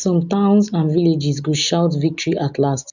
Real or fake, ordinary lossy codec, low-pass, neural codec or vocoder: real; none; 7.2 kHz; none